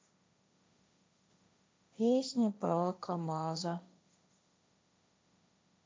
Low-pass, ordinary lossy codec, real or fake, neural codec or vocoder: none; none; fake; codec, 16 kHz, 1.1 kbps, Voila-Tokenizer